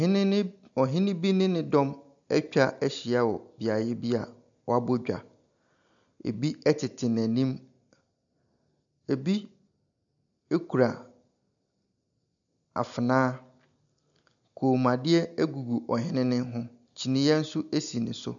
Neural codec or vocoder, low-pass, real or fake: none; 7.2 kHz; real